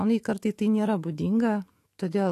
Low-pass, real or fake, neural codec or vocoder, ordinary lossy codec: 14.4 kHz; fake; autoencoder, 48 kHz, 128 numbers a frame, DAC-VAE, trained on Japanese speech; AAC, 48 kbps